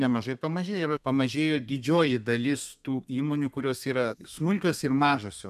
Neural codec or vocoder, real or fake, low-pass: codec, 32 kHz, 1.9 kbps, SNAC; fake; 14.4 kHz